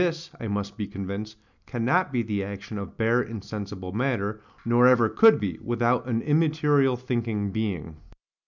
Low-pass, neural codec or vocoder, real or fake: 7.2 kHz; none; real